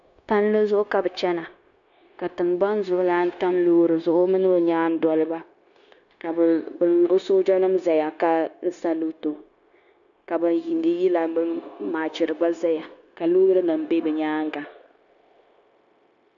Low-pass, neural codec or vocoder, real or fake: 7.2 kHz; codec, 16 kHz, 0.9 kbps, LongCat-Audio-Codec; fake